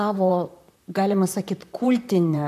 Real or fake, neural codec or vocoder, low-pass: fake; vocoder, 44.1 kHz, 128 mel bands, Pupu-Vocoder; 14.4 kHz